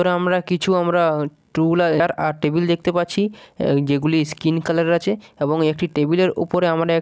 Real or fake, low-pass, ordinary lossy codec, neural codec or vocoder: real; none; none; none